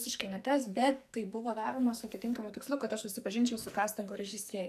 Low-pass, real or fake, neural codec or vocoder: 14.4 kHz; fake; codec, 44.1 kHz, 2.6 kbps, SNAC